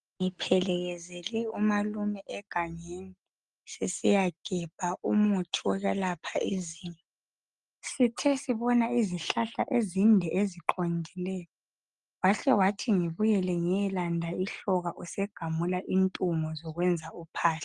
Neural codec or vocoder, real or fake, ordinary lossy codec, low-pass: none; real; Opus, 24 kbps; 10.8 kHz